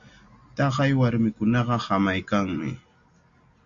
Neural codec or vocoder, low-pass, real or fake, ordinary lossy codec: none; 7.2 kHz; real; Opus, 64 kbps